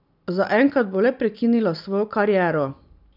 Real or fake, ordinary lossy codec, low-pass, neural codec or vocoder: real; none; 5.4 kHz; none